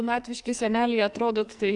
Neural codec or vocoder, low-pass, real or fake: codec, 44.1 kHz, 2.6 kbps, SNAC; 10.8 kHz; fake